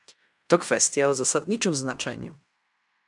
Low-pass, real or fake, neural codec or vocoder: 10.8 kHz; fake; codec, 16 kHz in and 24 kHz out, 0.9 kbps, LongCat-Audio-Codec, fine tuned four codebook decoder